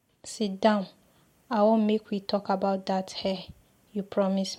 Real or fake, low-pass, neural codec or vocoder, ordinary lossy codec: real; 19.8 kHz; none; MP3, 64 kbps